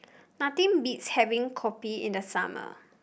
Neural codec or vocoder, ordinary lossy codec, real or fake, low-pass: none; none; real; none